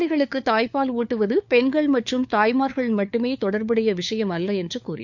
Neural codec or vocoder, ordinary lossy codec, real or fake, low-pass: codec, 16 kHz, 4 kbps, FunCodec, trained on LibriTTS, 50 frames a second; none; fake; 7.2 kHz